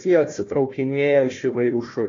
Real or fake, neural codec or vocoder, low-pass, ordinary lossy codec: fake; codec, 16 kHz, 1 kbps, FunCodec, trained on Chinese and English, 50 frames a second; 7.2 kHz; AAC, 32 kbps